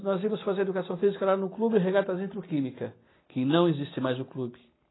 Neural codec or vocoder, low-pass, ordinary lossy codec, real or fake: none; 7.2 kHz; AAC, 16 kbps; real